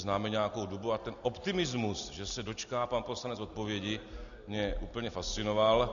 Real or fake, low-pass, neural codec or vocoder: real; 7.2 kHz; none